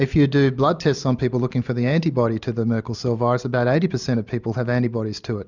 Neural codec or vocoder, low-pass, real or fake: none; 7.2 kHz; real